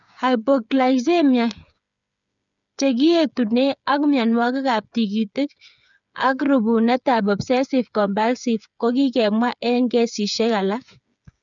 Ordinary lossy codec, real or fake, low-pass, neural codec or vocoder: none; fake; 7.2 kHz; codec, 16 kHz, 8 kbps, FreqCodec, smaller model